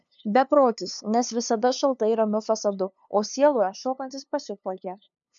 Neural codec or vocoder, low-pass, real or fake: codec, 16 kHz, 2 kbps, FunCodec, trained on LibriTTS, 25 frames a second; 7.2 kHz; fake